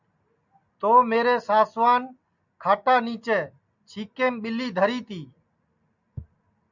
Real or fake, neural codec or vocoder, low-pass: real; none; 7.2 kHz